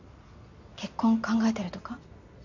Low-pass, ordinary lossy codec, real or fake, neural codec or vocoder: 7.2 kHz; none; real; none